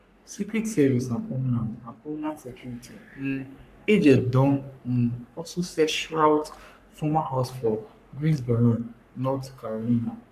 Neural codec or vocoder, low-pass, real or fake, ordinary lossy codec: codec, 44.1 kHz, 3.4 kbps, Pupu-Codec; 14.4 kHz; fake; none